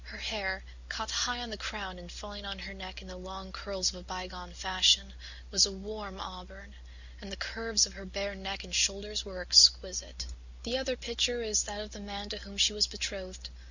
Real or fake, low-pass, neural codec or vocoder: real; 7.2 kHz; none